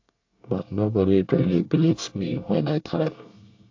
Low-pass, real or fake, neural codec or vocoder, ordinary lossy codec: 7.2 kHz; fake; codec, 24 kHz, 1 kbps, SNAC; AAC, 48 kbps